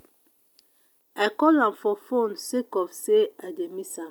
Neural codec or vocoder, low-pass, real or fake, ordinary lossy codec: none; 19.8 kHz; real; none